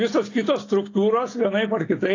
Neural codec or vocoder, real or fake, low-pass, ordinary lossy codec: none; real; 7.2 kHz; AAC, 32 kbps